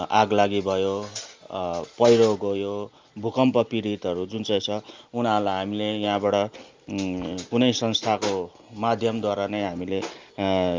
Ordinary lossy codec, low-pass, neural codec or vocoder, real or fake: Opus, 32 kbps; 7.2 kHz; none; real